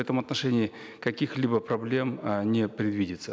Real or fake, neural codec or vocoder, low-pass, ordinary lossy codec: real; none; none; none